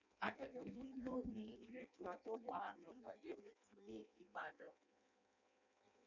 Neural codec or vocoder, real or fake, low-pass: codec, 16 kHz in and 24 kHz out, 0.6 kbps, FireRedTTS-2 codec; fake; 7.2 kHz